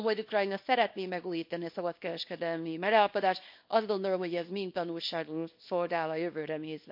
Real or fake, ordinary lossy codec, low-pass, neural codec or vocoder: fake; MP3, 32 kbps; 5.4 kHz; codec, 24 kHz, 0.9 kbps, WavTokenizer, small release